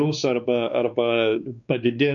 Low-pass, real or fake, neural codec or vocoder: 7.2 kHz; fake; codec, 16 kHz, 2 kbps, X-Codec, WavLM features, trained on Multilingual LibriSpeech